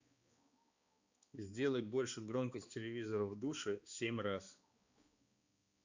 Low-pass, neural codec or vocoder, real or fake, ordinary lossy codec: 7.2 kHz; codec, 16 kHz, 2 kbps, X-Codec, HuBERT features, trained on balanced general audio; fake; Opus, 64 kbps